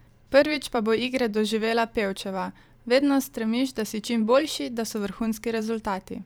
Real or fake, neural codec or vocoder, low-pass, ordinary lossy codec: fake; vocoder, 44.1 kHz, 128 mel bands every 512 samples, BigVGAN v2; none; none